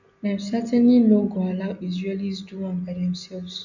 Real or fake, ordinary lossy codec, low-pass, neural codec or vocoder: real; none; 7.2 kHz; none